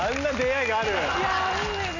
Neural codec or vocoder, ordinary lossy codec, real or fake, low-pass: none; none; real; 7.2 kHz